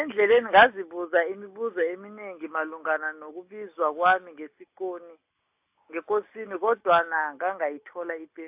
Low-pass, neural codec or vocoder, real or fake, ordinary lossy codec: 3.6 kHz; none; real; AAC, 32 kbps